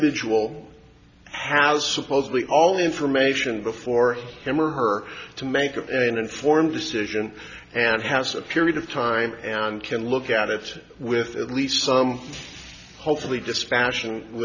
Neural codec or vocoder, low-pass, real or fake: none; 7.2 kHz; real